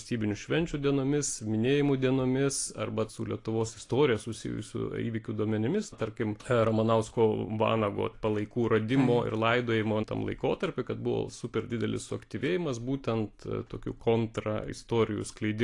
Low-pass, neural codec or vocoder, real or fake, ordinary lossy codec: 10.8 kHz; none; real; AAC, 48 kbps